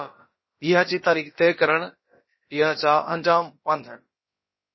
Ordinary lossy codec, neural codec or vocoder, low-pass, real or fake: MP3, 24 kbps; codec, 16 kHz, about 1 kbps, DyCAST, with the encoder's durations; 7.2 kHz; fake